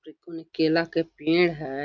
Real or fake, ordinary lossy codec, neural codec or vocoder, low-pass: real; none; none; 7.2 kHz